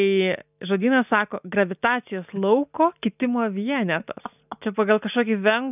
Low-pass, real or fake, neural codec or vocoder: 3.6 kHz; real; none